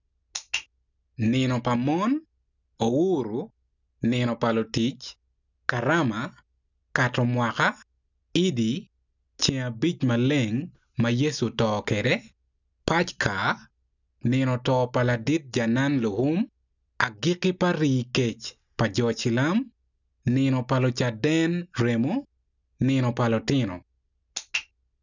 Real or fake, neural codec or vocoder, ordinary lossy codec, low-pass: real; none; none; 7.2 kHz